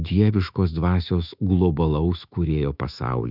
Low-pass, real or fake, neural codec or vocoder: 5.4 kHz; fake; autoencoder, 48 kHz, 128 numbers a frame, DAC-VAE, trained on Japanese speech